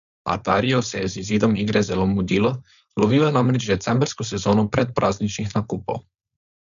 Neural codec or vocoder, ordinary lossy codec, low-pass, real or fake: codec, 16 kHz, 4.8 kbps, FACodec; none; 7.2 kHz; fake